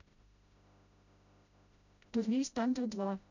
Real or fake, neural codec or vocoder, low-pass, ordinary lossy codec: fake; codec, 16 kHz, 0.5 kbps, FreqCodec, smaller model; 7.2 kHz; none